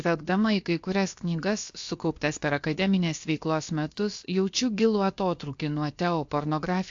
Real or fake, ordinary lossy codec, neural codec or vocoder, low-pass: fake; AAC, 64 kbps; codec, 16 kHz, about 1 kbps, DyCAST, with the encoder's durations; 7.2 kHz